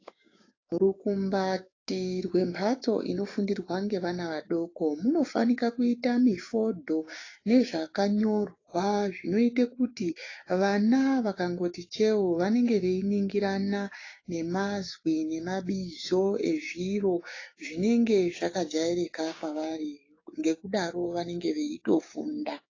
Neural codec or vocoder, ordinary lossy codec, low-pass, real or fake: codec, 16 kHz, 6 kbps, DAC; AAC, 32 kbps; 7.2 kHz; fake